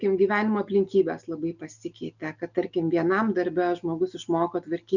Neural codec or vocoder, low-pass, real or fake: none; 7.2 kHz; real